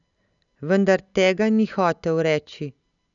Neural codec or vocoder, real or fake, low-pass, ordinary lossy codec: none; real; 7.2 kHz; none